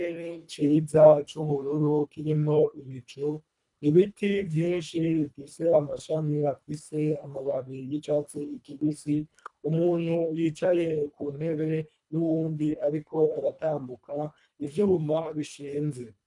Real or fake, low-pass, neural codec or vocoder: fake; 10.8 kHz; codec, 24 kHz, 1.5 kbps, HILCodec